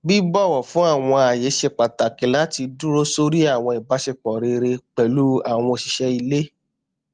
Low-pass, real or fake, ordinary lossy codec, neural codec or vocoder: 9.9 kHz; real; Opus, 24 kbps; none